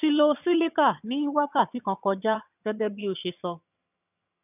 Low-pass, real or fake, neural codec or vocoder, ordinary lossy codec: 3.6 kHz; fake; vocoder, 22.05 kHz, 80 mel bands, HiFi-GAN; none